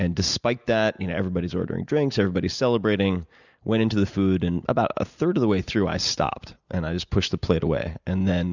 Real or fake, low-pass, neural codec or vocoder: real; 7.2 kHz; none